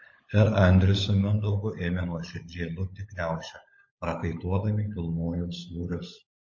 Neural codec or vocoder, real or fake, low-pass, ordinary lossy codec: codec, 16 kHz, 16 kbps, FunCodec, trained on LibriTTS, 50 frames a second; fake; 7.2 kHz; MP3, 32 kbps